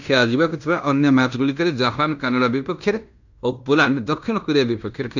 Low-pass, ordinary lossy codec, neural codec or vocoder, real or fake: 7.2 kHz; none; codec, 16 kHz in and 24 kHz out, 0.9 kbps, LongCat-Audio-Codec, fine tuned four codebook decoder; fake